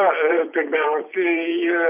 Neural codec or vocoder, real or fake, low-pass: vocoder, 44.1 kHz, 128 mel bands every 256 samples, BigVGAN v2; fake; 3.6 kHz